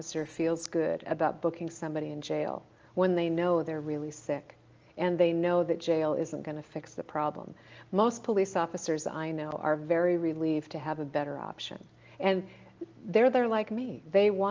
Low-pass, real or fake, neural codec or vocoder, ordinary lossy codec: 7.2 kHz; real; none; Opus, 24 kbps